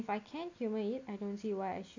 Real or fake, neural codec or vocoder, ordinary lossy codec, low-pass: real; none; AAC, 48 kbps; 7.2 kHz